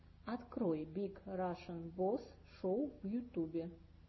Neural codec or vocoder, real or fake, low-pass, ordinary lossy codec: none; real; 7.2 kHz; MP3, 24 kbps